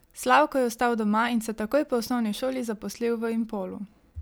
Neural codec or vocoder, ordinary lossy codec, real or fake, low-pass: none; none; real; none